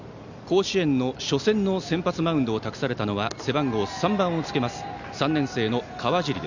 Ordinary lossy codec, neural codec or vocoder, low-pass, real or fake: none; none; 7.2 kHz; real